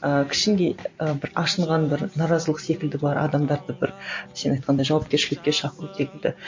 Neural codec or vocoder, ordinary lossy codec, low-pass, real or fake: none; MP3, 48 kbps; 7.2 kHz; real